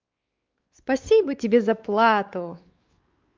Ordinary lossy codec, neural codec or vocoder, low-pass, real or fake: Opus, 24 kbps; codec, 16 kHz, 4 kbps, X-Codec, WavLM features, trained on Multilingual LibriSpeech; 7.2 kHz; fake